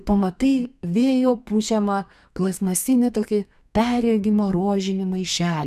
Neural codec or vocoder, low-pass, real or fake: codec, 32 kHz, 1.9 kbps, SNAC; 14.4 kHz; fake